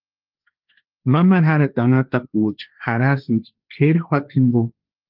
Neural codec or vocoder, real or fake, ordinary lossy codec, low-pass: codec, 16 kHz, 1.1 kbps, Voila-Tokenizer; fake; Opus, 24 kbps; 5.4 kHz